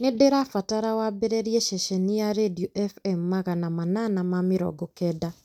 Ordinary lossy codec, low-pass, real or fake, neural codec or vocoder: none; 19.8 kHz; real; none